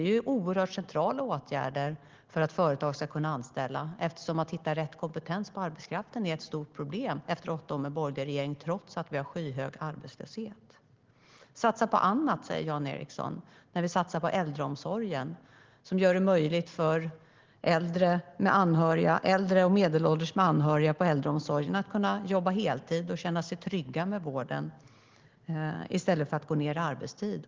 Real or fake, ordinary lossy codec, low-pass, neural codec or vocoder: real; Opus, 16 kbps; 7.2 kHz; none